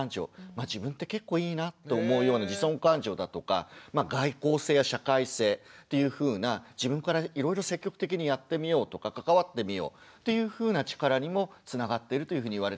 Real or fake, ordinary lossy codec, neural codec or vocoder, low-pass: real; none; none; none